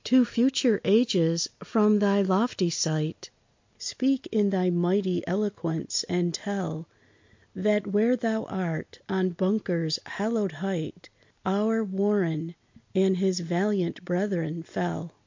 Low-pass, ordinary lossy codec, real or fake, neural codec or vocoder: 7.2 kHz; MP3, 48 kbps; real; none